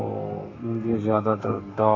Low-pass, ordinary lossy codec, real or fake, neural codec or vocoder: 7.2 kHz; AAC, 32 kbps; fake; codec, 32 kHz, 1.9 kbps, SNAC